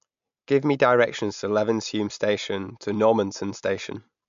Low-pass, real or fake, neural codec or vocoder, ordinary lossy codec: 7.2 kHz; real; none; MP3, 64 kbps